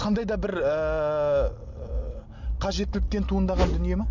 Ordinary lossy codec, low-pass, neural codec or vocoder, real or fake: none; 7.2 kHz; none; real